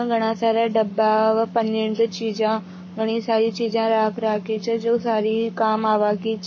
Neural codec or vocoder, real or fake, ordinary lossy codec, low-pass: codec, 44.1 kHz, 7.8 kbps, Pupu-Codec; fake; MP3, 32 kbps; 7.2 kHz